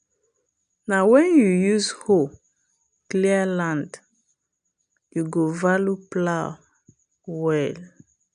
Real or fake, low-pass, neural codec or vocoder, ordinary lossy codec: real; 9.9 kHz; none; none